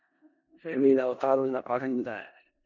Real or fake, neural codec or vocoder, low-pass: fake; codec, 16 kHz in and 24 kHz out, 0.4 kbps, LongCat-Audio-Codec, four codebook decoder; 7.2 kHz